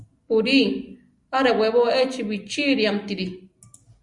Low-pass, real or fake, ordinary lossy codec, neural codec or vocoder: 10.8 kHz; real; Opus, 64 kbps; none